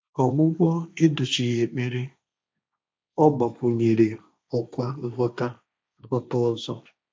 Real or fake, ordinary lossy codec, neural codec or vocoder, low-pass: fake; none; codec, 16 kHz, 1.1 kbps, Voila-Tokenizer; none